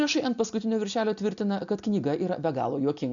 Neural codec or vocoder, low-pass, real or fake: none; 7.2 kHz; real